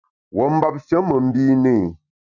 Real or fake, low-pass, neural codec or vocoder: real; 7.2 kHz; none